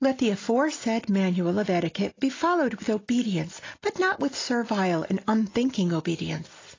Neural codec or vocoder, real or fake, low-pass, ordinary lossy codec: none; real; 7.2 kHz; AAC, 32 kbps